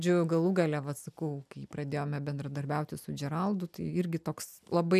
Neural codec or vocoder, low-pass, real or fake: none; 14.4 kHz; real